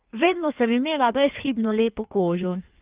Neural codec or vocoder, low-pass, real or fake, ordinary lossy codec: codec, 16 kHz in and 24 kHz out, 1.1 kbps, FireRedTTS-2 codec; 3.6 kHz; fake; Opus, 24 kbps